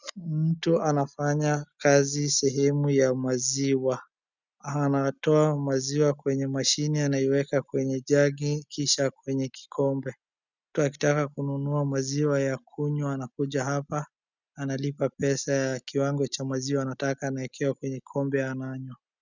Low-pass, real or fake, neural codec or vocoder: 7.2 kHz; real; none